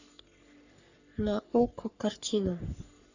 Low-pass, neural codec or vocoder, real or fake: 7.2 kHz; codec, 44.1 kHz, 3.4 kbps, Pupu-Codec; fake